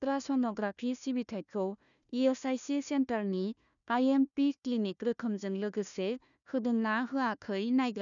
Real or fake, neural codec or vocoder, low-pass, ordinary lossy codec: fake; codec, 16 kHz, 1 kbps, FunCodec, trained on Chinese and English, 50 frames a second; 7.2 kHz; none